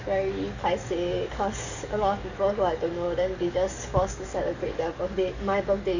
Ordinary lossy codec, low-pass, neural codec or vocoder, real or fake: none; 7.2 kHz; codec, 44.1 kHz, 7.8 kbps, DAC; fake